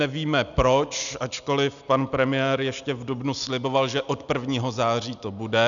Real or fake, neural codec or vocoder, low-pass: real; none; 7.2 kHz